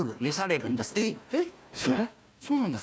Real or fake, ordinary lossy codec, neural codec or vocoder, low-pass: fake; none; codec, 16 kHz, 1 kbps, FunCodec, trained on Chinese and English, 50 frames a second; none